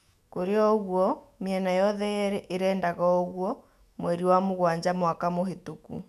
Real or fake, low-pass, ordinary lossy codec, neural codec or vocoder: fake; 14.4 kHz; none; autoencoder, 48 kHz, 128 numbers a frame, DAC-VAE, trained on Japanese speech